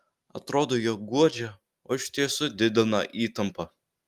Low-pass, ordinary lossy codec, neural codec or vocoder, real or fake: 14.4 kHz; Opus, 32 kbps; none; real